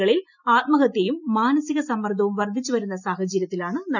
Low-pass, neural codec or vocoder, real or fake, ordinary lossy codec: 7.2 kHz; none; real; none